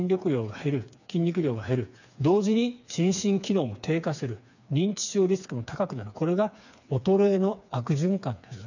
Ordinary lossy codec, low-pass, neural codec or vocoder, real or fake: none; 7.2 kHz; codec, 16 kHz, 4 kbps, FreqCodec, smaller model; fake